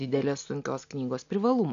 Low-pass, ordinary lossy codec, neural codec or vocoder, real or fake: 7.2 kHz; AAC, 64 kbps; none; real